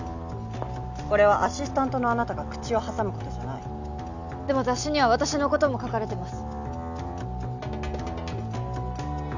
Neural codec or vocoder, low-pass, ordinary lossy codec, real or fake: none; 7.2 kHz; none; real